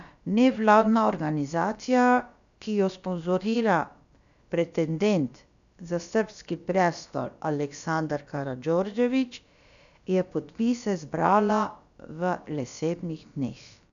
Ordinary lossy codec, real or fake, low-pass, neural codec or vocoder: none; fake; 7.2 kHz; codec, 16 kHz, about 1 kbps, DyCAST, with the encoder's durations